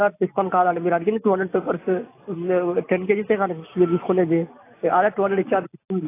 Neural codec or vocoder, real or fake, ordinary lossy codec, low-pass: none; real; AAC, 24 kbps; 3.6 kHz